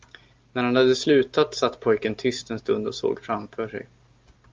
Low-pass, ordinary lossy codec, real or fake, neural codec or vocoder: 7.2 kHz; Opus, 24 kbps; real; none